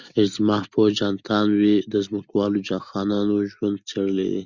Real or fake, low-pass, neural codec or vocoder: real; 7.2 kHz; none